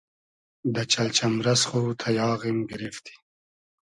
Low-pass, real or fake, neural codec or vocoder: 10.8 kHz; real; none